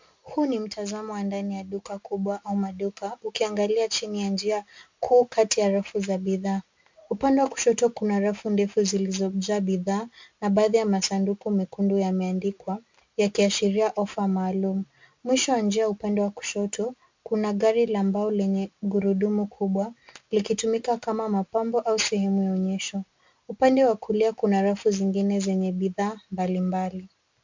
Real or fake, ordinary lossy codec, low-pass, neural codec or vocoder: real; MP3, 64 kbps; 7.2 kHz; none